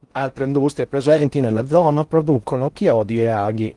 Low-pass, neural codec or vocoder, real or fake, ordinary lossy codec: 10.8 kHz; codec, 16 kHz in and 24 kHz out, 0.6 kbps, FocalCodec, streaming, 4096 codes; fake; Opus, 24 kbps